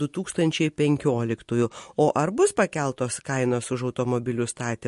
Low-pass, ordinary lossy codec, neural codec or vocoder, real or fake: 14.4 kHz; MP3, 48 kbps; none; real